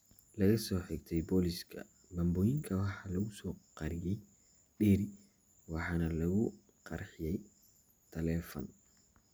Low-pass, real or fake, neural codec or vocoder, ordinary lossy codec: none; real; none; none